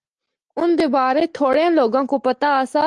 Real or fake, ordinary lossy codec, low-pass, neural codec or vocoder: real; Opus, 24 kbps; 10.8 kHz; none